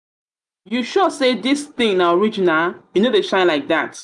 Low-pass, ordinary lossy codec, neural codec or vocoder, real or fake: 10.8 kHz; none; none; real